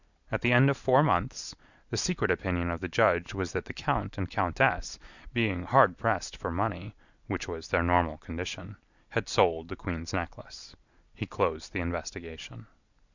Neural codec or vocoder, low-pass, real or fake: none; 7.2 kHz; real